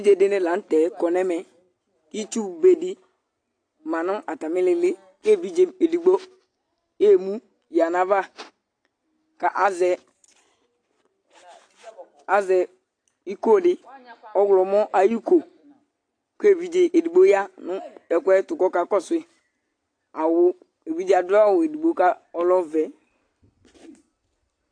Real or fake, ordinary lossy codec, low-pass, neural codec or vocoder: real; AAC, 48 kbps; 9.9 kHz; none